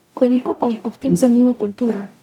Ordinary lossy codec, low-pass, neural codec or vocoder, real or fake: none; 19.8 kHz; codec, 44.1 kHz, 0.9 kbps, DAC; fake